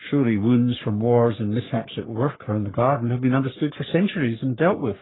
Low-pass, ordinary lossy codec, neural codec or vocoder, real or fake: 7.2 kHz; AAC, 16 kbps; codec, 44.1 kHz, 3.4 kbps, Pupu-Codec; fake